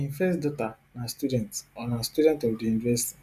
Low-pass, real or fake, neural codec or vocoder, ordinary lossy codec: 14.4 kHz; real; none; none